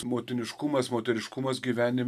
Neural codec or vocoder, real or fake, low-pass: none; real; 14.4 kHz